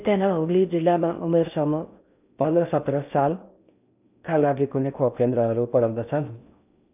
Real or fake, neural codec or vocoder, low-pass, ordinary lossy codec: fake; codec, 16 kHz in and 24 kHz out, 0.6 kbps, FocalCodec, streaming, 4096 codes; 3.6 kHz; none